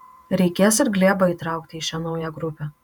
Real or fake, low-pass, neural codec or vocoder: fake; 19.8 kHz; vocoder, 48 kHz, 128 mel bands, Vocos